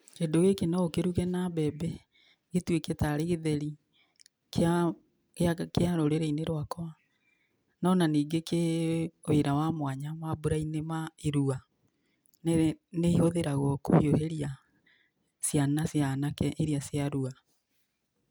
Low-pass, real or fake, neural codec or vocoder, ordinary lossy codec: none; real; none; none